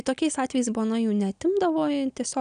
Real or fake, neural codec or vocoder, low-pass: real; none; 9.9 kHz